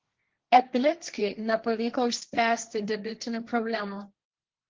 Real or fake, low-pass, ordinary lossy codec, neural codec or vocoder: fake; 7.2 kHz; Opus, 16 kbps; codec, 16 kHz, 1.1 kbps, Voila-Tokenizer